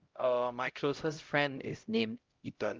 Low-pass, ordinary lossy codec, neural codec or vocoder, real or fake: 7.2 kHz; Opus, 32 kbps; codec, 16 kHz, 0.5 kbps, X-Codec, HuBERT features, trained on LibriSpeech; fake